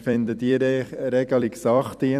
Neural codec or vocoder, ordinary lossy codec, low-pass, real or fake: vocoder, 44.1 kHz, 128 mel bands every 256 samples, BigVGAN v2; none; 14.4 kHz; fake